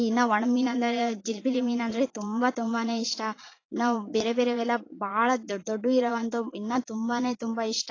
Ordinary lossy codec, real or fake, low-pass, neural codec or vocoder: AAC, 32 kbps; fake; 7.2 kHz; vocoder, 22.05 kHz, 80 mel bands, Vocos